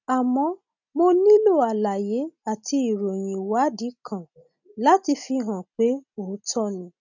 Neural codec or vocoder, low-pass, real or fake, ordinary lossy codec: none; 7.2 kHz; real; none